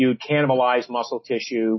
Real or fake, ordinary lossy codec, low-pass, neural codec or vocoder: real; MP3, 24 kbps; 7.2 kHz; none